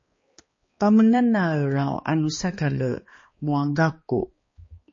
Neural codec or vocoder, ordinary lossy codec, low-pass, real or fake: codec, 16 kHz, 2 kbps, X-Codec, HuBERT features, trained on balanced general audio; MP3, 32 kbps; 7.2 kHz; fake